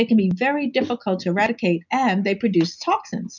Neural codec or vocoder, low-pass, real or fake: none; 7.2 kHz; real